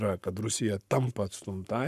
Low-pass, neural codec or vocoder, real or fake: 14.4 kHz; codec, 44.1 kHz, 7.8 kbps, Pupu-Codec; fake